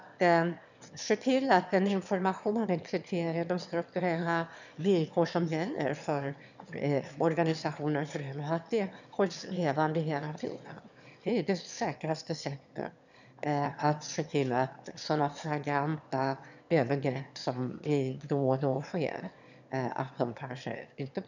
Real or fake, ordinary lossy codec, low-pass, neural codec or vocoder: fake; none; 7.2 kHz; autoencoder, 22.05 kHz, a latent of 192 numbers a frame, VITS, trained on one speaker